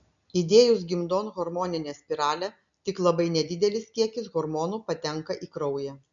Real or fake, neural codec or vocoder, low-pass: real; none; 7.2 kHz